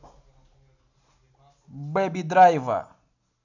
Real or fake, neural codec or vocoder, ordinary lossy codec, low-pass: real; none; none; 7.2 kHz